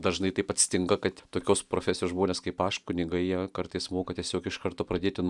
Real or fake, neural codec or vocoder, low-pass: real; none; 10.8 kHz